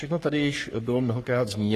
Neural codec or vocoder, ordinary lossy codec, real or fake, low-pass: codec, 44.1 kHz, 3.4 kbps, Pupu-Codec; AAC, 48 kbps; fake; 14.4 kHz